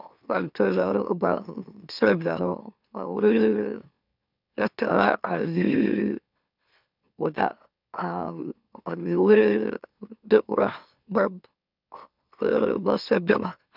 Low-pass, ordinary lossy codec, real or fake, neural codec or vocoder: 5.4 kHz; none; fake; autoencoder, 44.1 kHz, a latent of 192 numbers a frame, MeloTTS